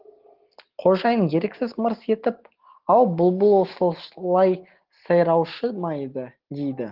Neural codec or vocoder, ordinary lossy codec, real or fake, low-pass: none; Opus, 16 kbps; real; 5.4 kHz